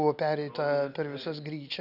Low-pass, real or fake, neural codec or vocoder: 5.4 kHz; real; none